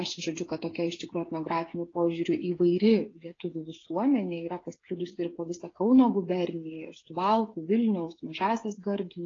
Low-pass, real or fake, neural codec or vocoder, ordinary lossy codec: 7.2 kHz; fake; codec, 16 kHz, 8 kbps, FreqCodec, smaller model; AAC, 32 kbps